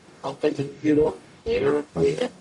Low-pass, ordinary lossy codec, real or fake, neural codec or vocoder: 10.8 kHz; AAC, 64 kbps; fake; codec, 44.1 kHz, 0.9 kbps, DAC